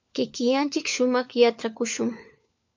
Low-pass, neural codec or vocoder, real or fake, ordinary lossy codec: 7.2 kHz; codec, 16 kHz, 4 kbps, FunCodec, trained on LibriTTS, 50 frames a second; fake; MP3, 64 kbps